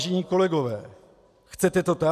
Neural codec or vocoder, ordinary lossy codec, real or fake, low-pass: none; AAC, 96 kbps; real; 14.4 kHz